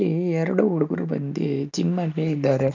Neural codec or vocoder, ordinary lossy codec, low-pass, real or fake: none; none; 7.2 kHz; real